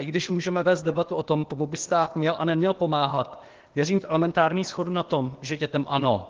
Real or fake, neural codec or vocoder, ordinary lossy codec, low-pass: fake; codec, 16 kHz, 0.8 kbps, ZipCodec; Opus, 16 kbps; 7.2 kHz